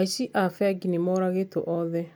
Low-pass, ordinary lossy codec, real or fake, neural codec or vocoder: none; none; real; none